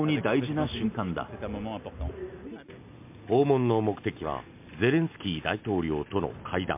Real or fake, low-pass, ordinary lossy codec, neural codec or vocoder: real; 3.6 kHz; MP3, 32 kbps; none